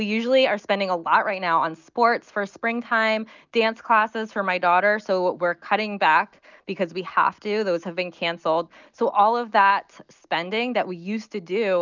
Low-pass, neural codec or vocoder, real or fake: 7.2 kHz; none; real